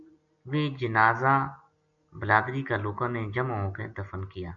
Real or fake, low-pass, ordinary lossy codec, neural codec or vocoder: real; 7.2 kHz; MP3, 96 kbps; none